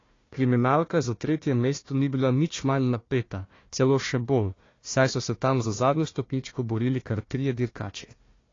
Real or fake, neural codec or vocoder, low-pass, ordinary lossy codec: fake; codec, 16 kHz, 1 kbps, FunCodec, trained on Chinese and English, 50 frames a second; 7.2 kHz; AAC, 32 kbps